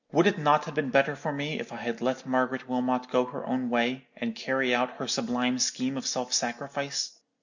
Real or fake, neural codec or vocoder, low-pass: real; none; 7.2 kHz